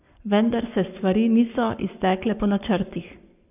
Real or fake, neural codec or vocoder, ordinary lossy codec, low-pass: fake; codec, 44.1 kHz, 7.8 kbps, Pupu-Codec; none; 3.6 kHz